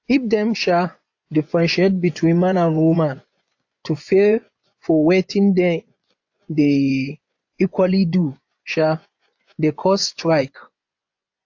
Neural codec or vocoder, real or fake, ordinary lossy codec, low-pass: vocoder, 24 kHz, 100 mel bands, Vocos; fake; AAC, 48 kbps; 7.2 kHz